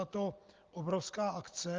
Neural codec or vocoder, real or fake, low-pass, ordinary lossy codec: none; real; 7.2 kHz; Opus, 32 kbps